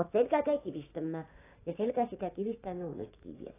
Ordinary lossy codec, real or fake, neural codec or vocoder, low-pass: AAC, 16 kbps; fake; codec, 16 kHz, 6 kbps, DAC; 3.6 kHz